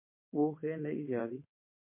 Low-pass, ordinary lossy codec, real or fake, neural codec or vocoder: 3.6 kHz; MP3, 24 kbps; fake; vocoder, 22.05 kHz, 80 mel bands, WaveNeXt